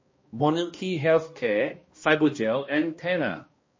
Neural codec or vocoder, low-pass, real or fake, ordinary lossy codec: codec, 16 kHz, 1 kbps, X-Codec, HuBERT features, trained on balanced general audio; 7.2 kHz; fake; MP3, 32 kbps